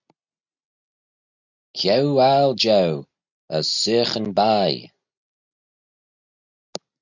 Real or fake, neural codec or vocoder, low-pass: real; none; 7.2 kHz